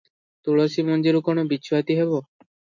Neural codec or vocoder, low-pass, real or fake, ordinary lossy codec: none; 7.2 kHz; real; MP3, 48 kbps